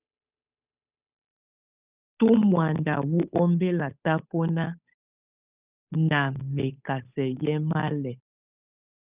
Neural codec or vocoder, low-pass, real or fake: codec, 16 kHz, 8 kbps, FunCodec, trained on Chinese and English, 25 frames a second; 3.6 kHz; fake